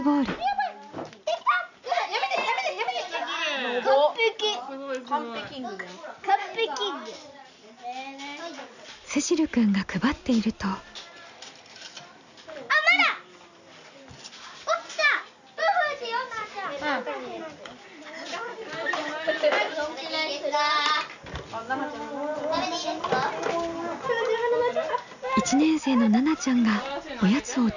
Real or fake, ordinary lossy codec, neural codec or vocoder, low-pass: real; none; none; 7.2 kHz